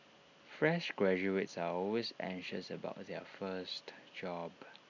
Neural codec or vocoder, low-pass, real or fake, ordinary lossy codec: none; 7.2 kHz; real; none